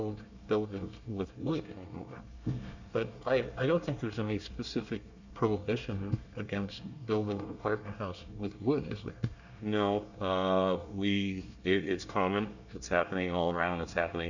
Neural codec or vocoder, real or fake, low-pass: codec, 24 kHz, 1 kbps, SNAC; fake; 7.2 kHz